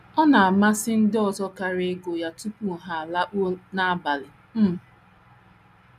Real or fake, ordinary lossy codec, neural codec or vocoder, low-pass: real; none; none; 14.4 kHz